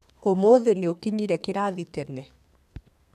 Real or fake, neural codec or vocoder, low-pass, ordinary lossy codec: fake; codec, 32 kHz, 1.9 kbps, SNAC; 14.4 kHz; none